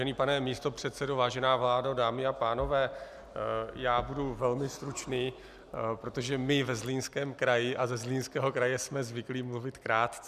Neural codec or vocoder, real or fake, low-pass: none; real; 14.4 kHz